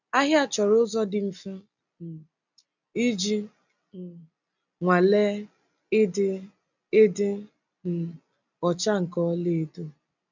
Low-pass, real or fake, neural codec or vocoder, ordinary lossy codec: 7.2 kHz; real; none; none